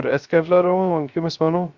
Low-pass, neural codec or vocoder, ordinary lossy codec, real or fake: 7.2 kHz; codec, 16 kHz, 0.3 kbps, FocalCodec; Opus, 64 kbps; fake